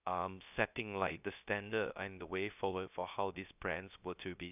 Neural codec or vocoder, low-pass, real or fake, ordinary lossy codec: codec, 16 kHz, 0.3 kbps, FocalCodec; 3.6 kHz; fake; none